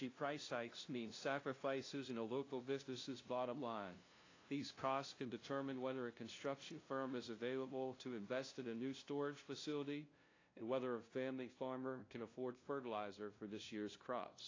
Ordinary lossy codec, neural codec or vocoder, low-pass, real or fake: AAC, 32 kbps; codec, 16 kHz, 0.5 kbps, FunCodec, trained on LibriTTS, 25 frames a second; 7.2 kHz; fake